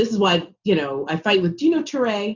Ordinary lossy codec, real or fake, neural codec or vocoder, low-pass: Opus, 64 kbps; real; none; 7.2 kHz